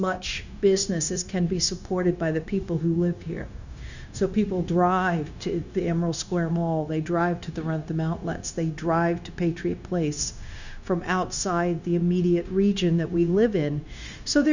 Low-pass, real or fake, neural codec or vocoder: 7.2 kHz; fake; codec, 16 kHz, 0.9 kbps, LongCat-Audio-Codec